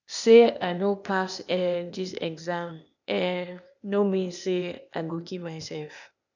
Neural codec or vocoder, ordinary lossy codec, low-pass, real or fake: codec, 16 kHz, 0.8 kbps, ZipCodec; none; 7.2 kHz; fake